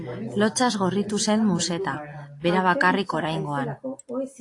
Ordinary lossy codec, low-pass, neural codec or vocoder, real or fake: AAC, 48 kbps; 10.8 kHz; none; real